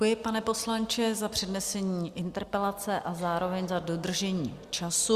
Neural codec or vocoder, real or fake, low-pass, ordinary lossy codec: none; real; 14.4 kHz; Opus, 64 kbps